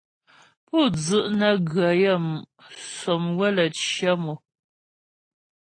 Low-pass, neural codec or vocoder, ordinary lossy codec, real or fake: 9.9 kHz; none; AAC, 32 kbps; real